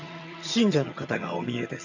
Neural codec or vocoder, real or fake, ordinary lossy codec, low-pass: vocoder, 22.05 kHz, 80 mel bands, HiFi-GAN; fake; none; 7.2 kHz